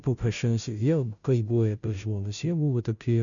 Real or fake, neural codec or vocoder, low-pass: fake; codec, 16 kHz, 0.5 kbps, FunCodec, trained on Chinese and English, 25 frames a second; 7.2 kHz